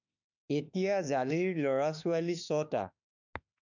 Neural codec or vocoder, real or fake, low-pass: autoencoder, 48 kHz, 32 numbers a frame, DAC-VAE, trained on Japanese speech; fake; 7.2 kHz